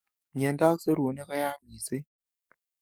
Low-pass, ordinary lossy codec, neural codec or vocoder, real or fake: none; none; codec, 44.1 kHz, 7.8 kbps, DAC; fake